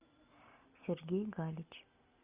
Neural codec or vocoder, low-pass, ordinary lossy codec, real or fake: none; 3.6 kHz; Opus, 64 kbps; real